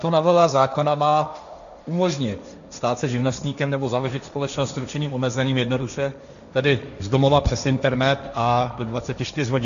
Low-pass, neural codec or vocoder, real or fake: 7.2 kHz; codec, 16 kHz, 1.1 kbps, Voila-Tokenizer; fake